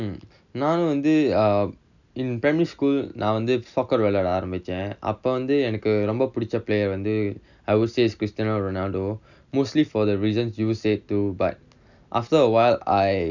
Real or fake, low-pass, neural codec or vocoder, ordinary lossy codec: real; 7.2 kHz; none; none